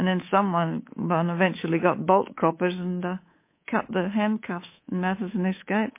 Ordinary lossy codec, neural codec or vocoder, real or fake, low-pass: MP3, 24 kbps; codec, 24 kHz, 3.1 kbps, DualCodec; fake; 3.6 kHz